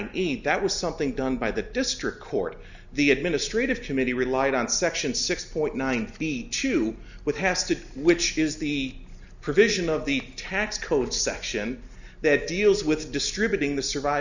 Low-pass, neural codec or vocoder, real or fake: 7.2 kHz; none; real